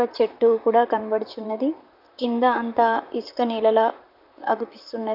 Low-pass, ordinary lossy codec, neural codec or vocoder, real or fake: 5.4 kHz; none; vocoder, 44.1 kHz, 128 mel bands, Pupu-Vocoder; fake